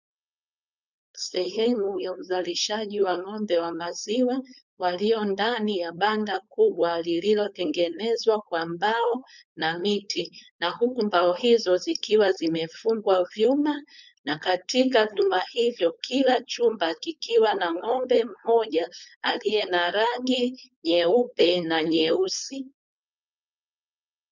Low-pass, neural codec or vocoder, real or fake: 7.2 kHz; codec, 16 kHz, 4.8 kbps, FACodec; fake